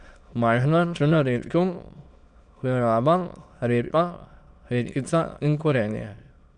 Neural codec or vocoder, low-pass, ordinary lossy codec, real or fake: autoencoder, 22.05 kHz, a latent of 192 numbers a frame, VITS, trained on many speakers; 9.9 kHz; none; fake